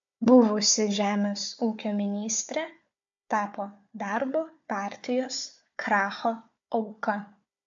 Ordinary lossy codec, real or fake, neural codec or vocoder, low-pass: AAC, 64 kbps; fake; codec, 16 kHz, 4 kbps, FunCodec, trained on Chinese and English, 50 frames a second; 7.2 kHz